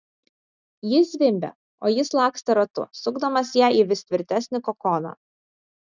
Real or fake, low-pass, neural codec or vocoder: real; 7.2 kHz; none